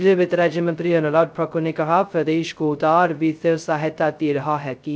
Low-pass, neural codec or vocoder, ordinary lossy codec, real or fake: none; codec, 16 kHz, 0.2 kbps, FocalCodec; none; fake